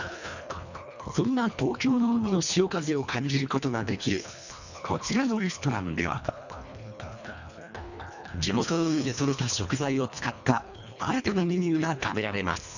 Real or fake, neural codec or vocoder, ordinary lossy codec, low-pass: fake; codec, 24 kHz, 1.5 kbps, HILCodec; none; 7.2 kHz